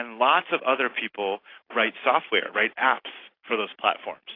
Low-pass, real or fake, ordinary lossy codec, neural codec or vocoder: 5.4 kHz; real; AAC, 32 kbps; none